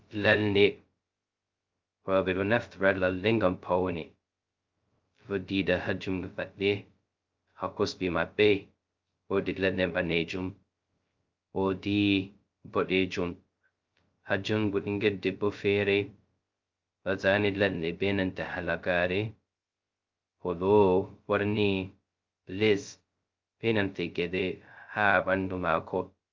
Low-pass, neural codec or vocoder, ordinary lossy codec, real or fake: 7.2 kHz; codec, 16 kHz, 0.2 kbps, FocalCodec; Opus, 24 kbps; fake